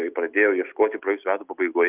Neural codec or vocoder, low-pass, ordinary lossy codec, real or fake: none; 3.6 kHz; Opus, 24 kbps; real